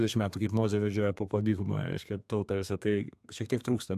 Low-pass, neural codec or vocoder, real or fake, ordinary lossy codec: 14.4 kHz; codec, 32 kHz, 1.9 kbps, SNAC; fake; AAC, 96 kbps